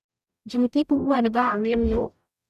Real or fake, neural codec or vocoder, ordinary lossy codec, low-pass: fake; codec, 44.1 kHz, 0.9 kbps, DAC; none; 14.4 kHz